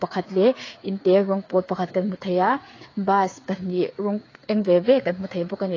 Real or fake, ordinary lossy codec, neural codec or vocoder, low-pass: fake; AAC, 32 kbps; codec, 16 kHz, 8 kbps, FreqCodec, smaller model; 7.2 kHz